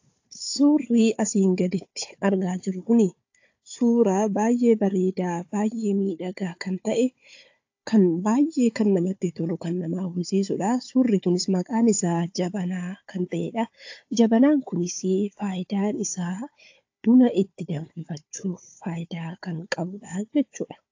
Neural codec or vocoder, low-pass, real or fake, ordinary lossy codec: codec, 16 kHz, 4 kbps, FunCodec, trained on Chinese and English, 50 frames a second; 7.2 kHz; fake; AAC, 48 kbps